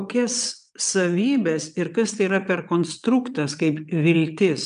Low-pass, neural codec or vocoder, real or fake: 9.9 kHz; vocoder, 22.05 kHz, 80 mel bands, WaveNeXt; fake